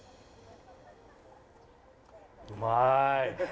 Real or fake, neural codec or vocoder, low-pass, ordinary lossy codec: real; none; none; none